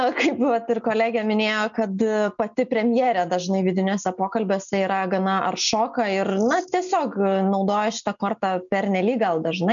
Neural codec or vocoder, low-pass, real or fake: none; 7.2 kHz; real